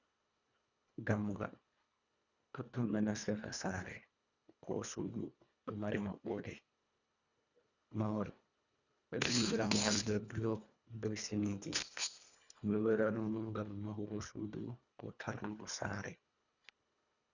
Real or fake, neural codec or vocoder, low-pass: fake; codec, 24 kHz, 1.5 kbps, HILCodec; 7.2 kHz